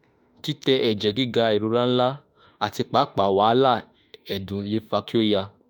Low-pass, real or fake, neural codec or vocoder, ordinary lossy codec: none; fake; autoencoder, 48 kHz, 32 numbers a frame, DAC-VAE, trained on Japanese speech; none